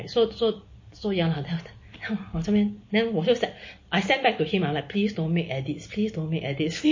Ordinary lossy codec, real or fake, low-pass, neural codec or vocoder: MP3, 32 kbps; real; 7.2 kHz; none